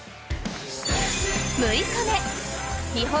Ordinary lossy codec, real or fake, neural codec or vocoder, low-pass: none; real; none; none